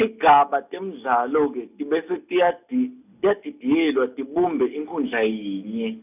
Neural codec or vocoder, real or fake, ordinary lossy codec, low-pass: none; real; none; 3.6 kHz